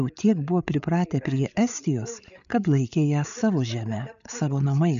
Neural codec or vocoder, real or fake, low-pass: codec, 16 kHz, 8 kbps, FreqCodec, larger model; fake; 7.2 kHz